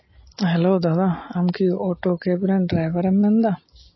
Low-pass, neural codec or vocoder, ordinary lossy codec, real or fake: 7.2 kHz; none; MP3, 24 kbps; real